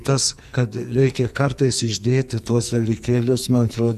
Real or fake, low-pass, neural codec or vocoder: fake; 14.4 kHz; codec, 44.1 kHz, 2.6 kbps, SNAC